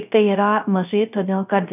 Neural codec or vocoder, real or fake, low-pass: codec, 16 kHz, 0.3 kbps, FocalCodec; fake; 3.6 kHz